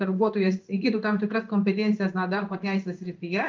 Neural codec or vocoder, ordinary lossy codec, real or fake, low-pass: codec, 16 kHz in and 24 kHz out, 1 kbps, XY-Tokenizer; Opus, 24 kbps; fake; 7.2 kHz